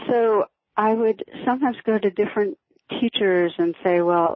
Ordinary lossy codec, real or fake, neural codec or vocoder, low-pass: MP3, 24 kbps; real; none; 7.2 kHz